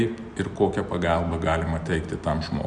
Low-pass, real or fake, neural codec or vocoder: 9.9 kHz; real; none